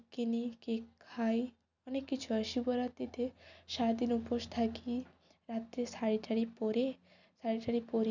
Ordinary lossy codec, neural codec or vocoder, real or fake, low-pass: none; none; real; 7.2 kHz